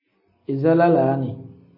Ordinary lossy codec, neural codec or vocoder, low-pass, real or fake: MP3, 24 kbps; none; 5.4 kHz; real